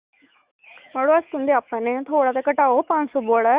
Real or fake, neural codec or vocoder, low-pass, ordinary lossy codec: fake; autoencoder, 48 kHz, 128 numbers a frame, DAC-VAE, trained on Japanese speech; 3.6 kHz; Opus, 64 kbps